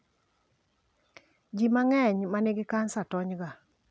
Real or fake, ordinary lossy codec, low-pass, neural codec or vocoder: real; none; none; none